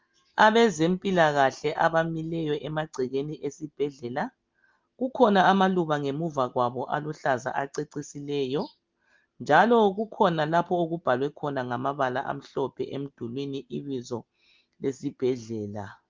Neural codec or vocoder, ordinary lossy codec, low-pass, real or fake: none; Opus, 32 kbps; 7.2 kHz; real